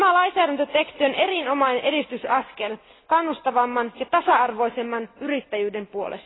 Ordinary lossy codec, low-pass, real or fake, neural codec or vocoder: AAC, 16 kbps; 7.2 kHz; real; none